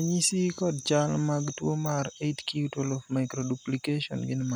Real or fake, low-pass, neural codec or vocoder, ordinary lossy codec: real; none; none; none